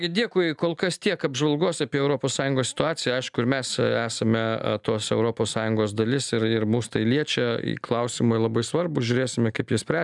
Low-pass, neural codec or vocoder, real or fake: 10.8 kHz; none; real